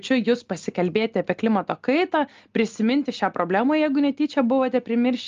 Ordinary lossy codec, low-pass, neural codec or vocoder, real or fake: Opus, 32 kbps; 7.2 kHz; none; real